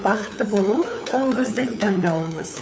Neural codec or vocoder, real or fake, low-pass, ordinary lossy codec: codec, 16 kHz, 8 kbps, FunCodec, trained on LibriTTS, 25 frames a second; fake; none; none